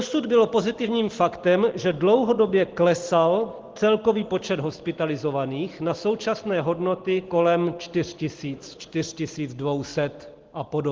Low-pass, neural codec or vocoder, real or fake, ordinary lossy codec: 7.2 kHz; none; real; Opus, 16 kbps